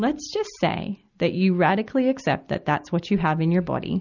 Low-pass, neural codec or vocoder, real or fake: 7.2 kHz; none; real